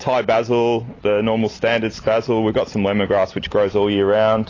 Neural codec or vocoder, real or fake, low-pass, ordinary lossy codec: none; real; 7.2 kHz; AAC, 32 kbps